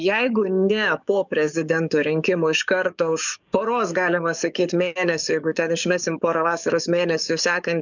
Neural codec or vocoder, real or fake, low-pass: codec, 44.1 kHz, 7.8 kbps, DAC; fake; 7.2 kHz